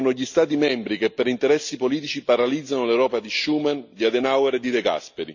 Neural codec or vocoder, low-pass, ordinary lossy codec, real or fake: none; 7.2 kHz; none; real